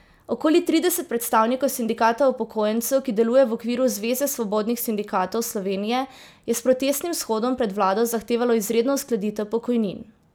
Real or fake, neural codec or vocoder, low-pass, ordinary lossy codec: real; none; none; none